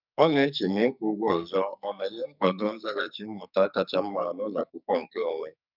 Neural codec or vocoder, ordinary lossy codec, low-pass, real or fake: codec, 44.1 kHz, 2.6 kbps, SNAC; none; 5.4 kHz; fake